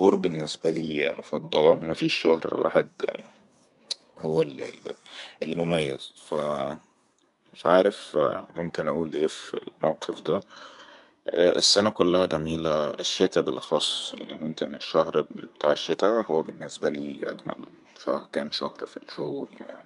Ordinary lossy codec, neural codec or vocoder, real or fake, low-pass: none; codec, 24 kHz, 1 kbps, SNAC; fake; 10.8 kHz